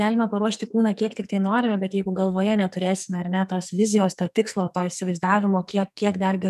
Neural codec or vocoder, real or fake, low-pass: codec, 44.1 kHz, 2.6 kbps, SNAC; fake; 14.4 kHz